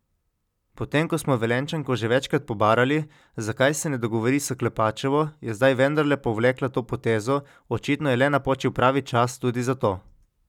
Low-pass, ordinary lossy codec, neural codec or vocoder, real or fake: 19.8 kHz; none; none; real